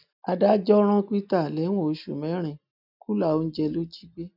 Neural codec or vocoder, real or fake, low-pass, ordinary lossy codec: none; real; 5.4 kHz; none